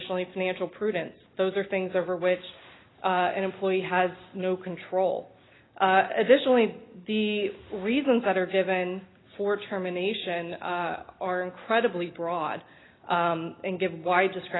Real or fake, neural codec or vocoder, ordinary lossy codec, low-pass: real; none; AAC, 16 kbps; 7.2 kHz